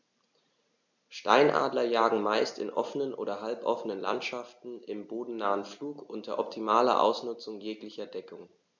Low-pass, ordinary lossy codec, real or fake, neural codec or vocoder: 7.2 kHz; none; real; none